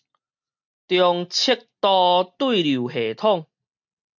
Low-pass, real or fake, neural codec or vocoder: 7.2 kHz; real; none